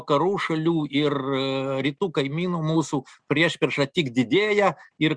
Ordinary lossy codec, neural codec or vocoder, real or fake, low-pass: Opus, 64 kbps; none; real; 9.9 kHz